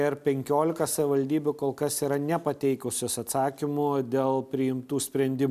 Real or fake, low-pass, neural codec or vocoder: real; 14.4 kHz; none